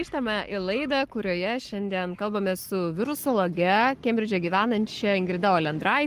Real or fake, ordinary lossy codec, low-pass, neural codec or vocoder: fake; Opus, 24 kbps; 14.4 kHz; codec, 44.1 kHz, 7.8 kbps, Pupu-Codec